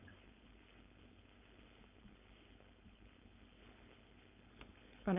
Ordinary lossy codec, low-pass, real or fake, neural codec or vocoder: none; 3.6 kHz; fake; codec, 16 kHz, 4.8 kbps, FACodec